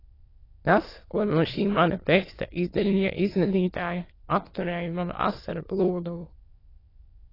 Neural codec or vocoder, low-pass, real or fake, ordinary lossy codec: autoencoder, 22.05 kHz, a latent of 192 numbers a frame, VITS, trained on many speakers; 5.4 kHz; fake; AAC, 24 kbps